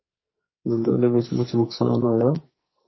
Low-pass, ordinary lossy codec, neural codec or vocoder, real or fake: 7.2 kHz; MP3, 24 kbps; codec, 44.1 kHz, 2.6 kbps, SNAC; fake